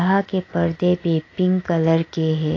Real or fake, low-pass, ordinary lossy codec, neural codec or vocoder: real; 7.2 kHz; AAC, 32 kbps; none